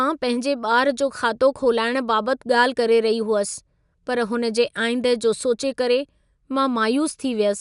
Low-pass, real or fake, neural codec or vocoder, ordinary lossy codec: 10.8 kHz; real; none; none